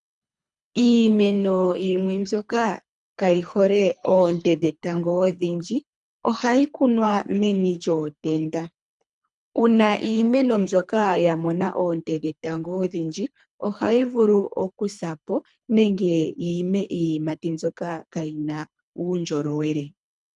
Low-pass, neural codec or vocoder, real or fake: 10.8 kHz; codec, 24 kHz, 3 kbps, HILCodec; fake